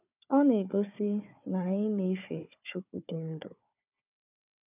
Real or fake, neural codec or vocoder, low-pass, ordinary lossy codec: fake; codec, 16 kHz, 4 kbps, FunCodec, trained on Chinese and English, 50 frames a second; 3.6 kHz; none